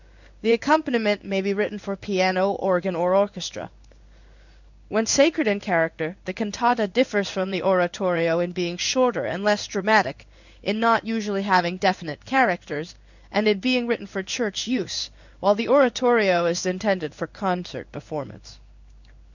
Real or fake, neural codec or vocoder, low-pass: fake; codec, 16 kHz in and 24 kHz out, 1 kbps, XY-Tokenizer; 7.2 kHz